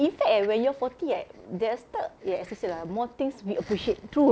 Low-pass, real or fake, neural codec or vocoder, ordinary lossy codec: none; real; none; none